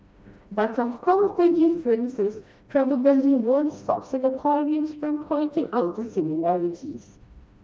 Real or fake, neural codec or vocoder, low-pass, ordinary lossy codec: fake; codec, 16 kHz, 1 kbps, FreqCodec, smaller model; none; none